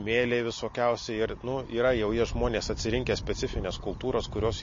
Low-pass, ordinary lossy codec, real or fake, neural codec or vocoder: 7.2 kHz; MP3, 32 kbps; real; none